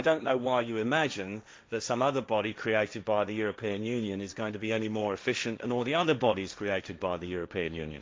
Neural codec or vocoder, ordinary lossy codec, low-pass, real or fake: codec, 16 kHz, 1.1 kbps, Voila-Tokenizer; none; none; fake